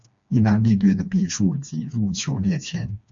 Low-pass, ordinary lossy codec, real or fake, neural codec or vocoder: 7.2 kHz; AAC, 64 kbps; fake; codec, 16 kHz, 2 kbps, FreqCodec, smaller model